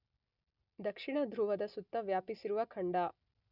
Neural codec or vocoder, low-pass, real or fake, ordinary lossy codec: none; 5.4 kHz; real; none